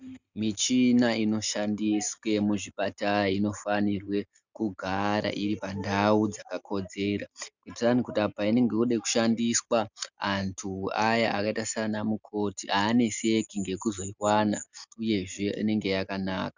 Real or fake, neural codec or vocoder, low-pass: real; none; 7.2 kHz